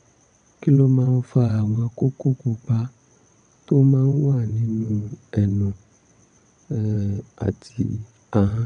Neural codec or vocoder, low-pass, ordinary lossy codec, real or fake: vocoder, 22.05 kHz, 80 mel bands, WaveNeXt; 9.9 kHz; none; fake